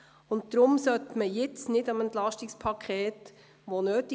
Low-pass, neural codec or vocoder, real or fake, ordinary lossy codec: none; none; real; none